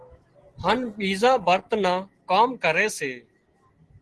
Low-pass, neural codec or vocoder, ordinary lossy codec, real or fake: 10.8 kHz; none; Opus, 16 kbps; real